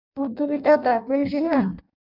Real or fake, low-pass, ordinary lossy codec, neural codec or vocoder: fake; 5.4 kHz; MP3, 48 kbps; codec, 16 kHz in and 24 kHz out, 0.6 kbps, FireRedTTS-2 codec